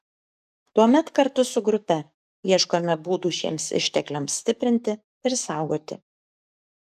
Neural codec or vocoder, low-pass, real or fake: codec, 44.1 kHz, 7.8 kbps, DAC; 14.4 kHz; fake